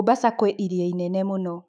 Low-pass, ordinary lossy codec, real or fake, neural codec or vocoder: 9.9 kHz; none; fake; autoencoder, 48 kHz, 128 numbers a frame, DAC-VAE, trained on Japanese speech